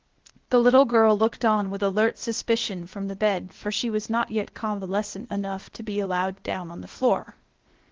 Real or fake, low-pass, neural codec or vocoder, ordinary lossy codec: fake; 7.2 kHz; codec, 16 kHz, 0.8 kbps, ZipCodec; Opus, 16 kbps